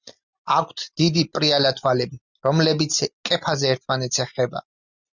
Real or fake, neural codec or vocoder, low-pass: real; none; 7.2 kHz